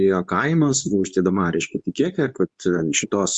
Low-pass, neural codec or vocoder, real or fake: 10.8 kHz; codec, 24 kHz, 0.9 kbps, WavTokenizer, medium speech release version 2; fake